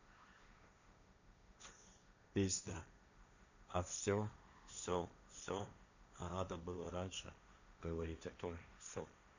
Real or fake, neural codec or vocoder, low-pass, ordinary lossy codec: fake; codec, 16 kHz, 1.1 kbps, Voila-Tokenizer; 7.2 kHz; none